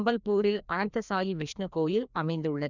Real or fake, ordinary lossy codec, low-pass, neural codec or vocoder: fake; none; 7.2 kHz; codec, 16 kHz, 1 kbps, FreqCodec, larger model